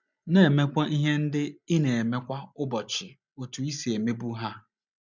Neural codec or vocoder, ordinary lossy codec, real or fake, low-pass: none; none; real; 7.2 kHz